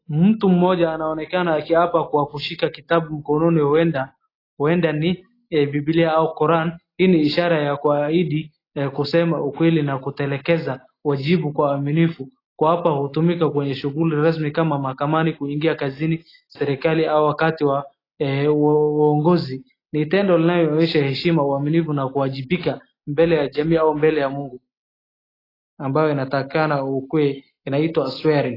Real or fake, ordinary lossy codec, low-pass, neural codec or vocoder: real; AAC, 24 kbps; 5.4 kHz; none